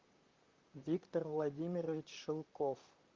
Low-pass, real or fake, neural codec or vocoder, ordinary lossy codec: 7.2 kHz; fake; vocoder, 44.1 kHz, 128 mel bands, Pupu-Vocoder; Opus, 24 kbps